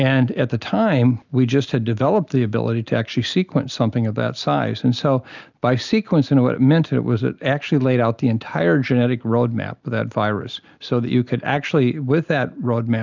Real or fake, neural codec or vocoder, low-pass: real; none; 7.2 kHz